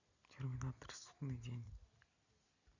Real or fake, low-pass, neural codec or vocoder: real; 7.2 kHz; none